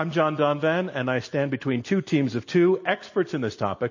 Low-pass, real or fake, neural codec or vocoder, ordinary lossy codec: 7.2 kHz; real; none; MP3, 32 kbps